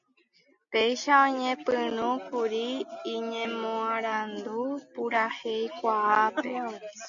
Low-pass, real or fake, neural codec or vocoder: 7.2 kHz; real; none